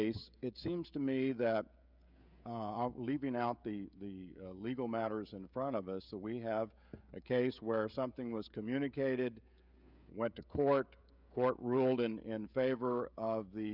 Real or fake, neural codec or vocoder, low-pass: fake; codec, 16 kHz, 16 kbps, FreqCodec, smaller model; 5.4 kHz